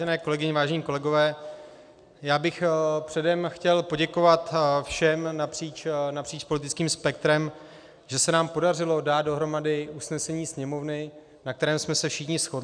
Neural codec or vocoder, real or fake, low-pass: none; real; 9.9 kHz